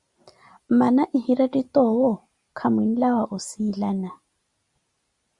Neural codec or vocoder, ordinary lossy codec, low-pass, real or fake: none; Opus, 64 kbps; 10.8 kHz; real